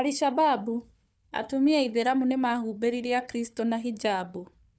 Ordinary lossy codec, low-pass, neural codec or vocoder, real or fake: none; none; codec, 16 kHz, 4 kbps, FunCodec, trained on Chinese and English, 50 frames a second; fake